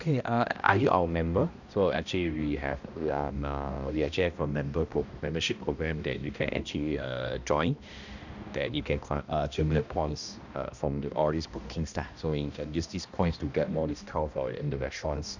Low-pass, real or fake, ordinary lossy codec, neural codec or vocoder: 7.2 kHz; fake; none; codec, 16 kHz, 1 kbps, X-Codec, HuBERT features, trained on balanced general audio